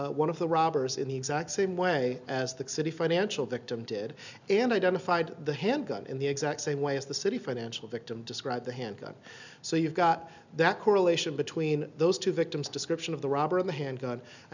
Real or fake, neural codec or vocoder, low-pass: real; none; 7.2 kHz